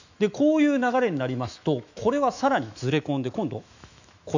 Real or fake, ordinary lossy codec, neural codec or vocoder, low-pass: fake; none; autoencoder, 48 kHz, 128 numbers a frame, DAC-VAE, trained on Japanese speech; 7.2 kHz